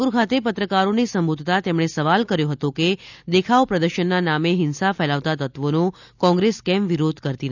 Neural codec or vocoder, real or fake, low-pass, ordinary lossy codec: none; real; 7.2 kHz; none